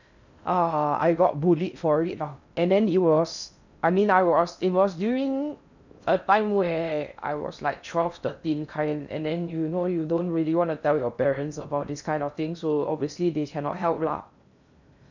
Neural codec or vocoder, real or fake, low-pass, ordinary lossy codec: codec, 16 kHz in and 24 kHz out, 0.6 kbps, FocalCodec, streaming, 2048 codes; fake; 7.2 kHz; none